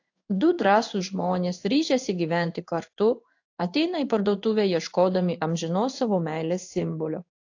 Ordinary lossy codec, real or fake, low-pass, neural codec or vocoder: AAC, 48 kbps; fake; 7.2 kHz; codec, 16 kHz in and 24 kHz out, 1 kbps, XY-Tokenizer